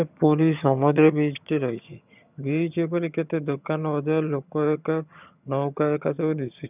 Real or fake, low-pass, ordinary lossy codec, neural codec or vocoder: fake; 3.6 kHz; none; vocoder, 22.05 kHz, 80 mel bands, HiFi-GAN